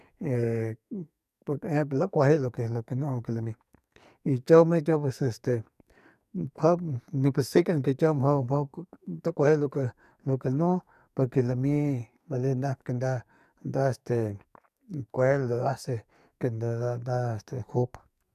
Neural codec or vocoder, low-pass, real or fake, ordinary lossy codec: codec, 44.1 kHz, 2.6 kbps, SNAC; 14.4 kHz; fake; none